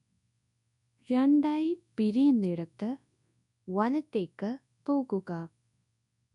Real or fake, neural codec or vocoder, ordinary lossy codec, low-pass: fake; codec, 24 kHz, 0.9 kbps, WavTokenizer, large speech release; none; 10.8 kHz